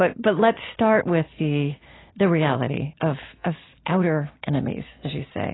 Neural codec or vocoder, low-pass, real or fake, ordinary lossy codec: none; 7.2 kHz; real; AAC, 16 kbps